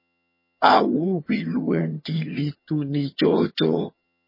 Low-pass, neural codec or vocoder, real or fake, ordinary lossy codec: 5.4 kHz; vocoder, 22.05 kHz, 80 mel bands, HiFi-GAN; fake; MP3, 24 kbps